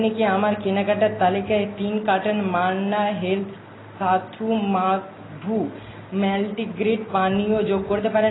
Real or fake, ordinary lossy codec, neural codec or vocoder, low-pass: real; AAC, 16 kbps; none; 7.2 kHz